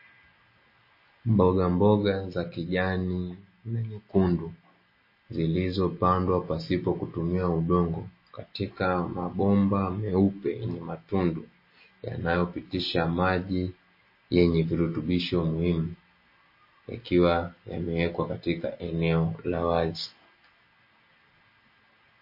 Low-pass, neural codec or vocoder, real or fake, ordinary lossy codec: 5.4 kHz; none; real; MP3, 24 kbps